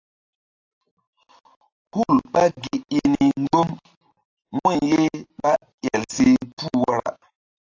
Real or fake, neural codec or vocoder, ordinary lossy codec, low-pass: real; none; AAC, 48 kbps; 7.2 kHz